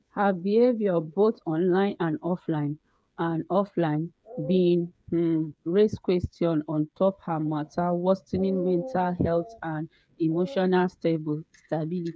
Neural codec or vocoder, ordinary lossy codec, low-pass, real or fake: codec, 16 kHz, 8 kbps, FreqCodec, smaller model; none; none; fake